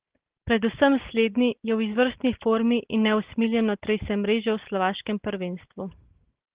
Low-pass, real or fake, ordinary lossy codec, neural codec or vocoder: 3.6 kHz; real; Opus, 16 kbps; none